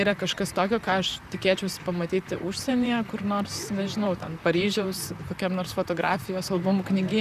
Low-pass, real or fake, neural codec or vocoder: 14.4 kHz; fake; vocoder, 44.1 kHz, 128 mel bands, Pupu-Vocoder